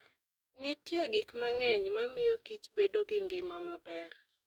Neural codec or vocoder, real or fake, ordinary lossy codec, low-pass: codec, 44.1 kHz, 2.6 kbps, DAC; fake; none; 19.8 kHz